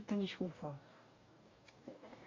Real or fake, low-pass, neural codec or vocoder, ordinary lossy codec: fake; 7.2 kHz; codec, 44.1 kHz, 2.6 kbps, DAC; MP3, 64 kbps